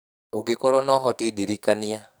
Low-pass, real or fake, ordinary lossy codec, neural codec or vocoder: none; fake; none; codec, 44.1 kHz, 2.6 kbps, SNAC